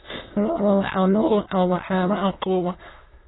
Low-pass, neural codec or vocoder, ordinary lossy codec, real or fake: 7.2 kHz; autoencoder, 22.05 kHz, a latent of 192 numbers a frame, VITS, trained on many speakers; AAC, 16 kbps; fake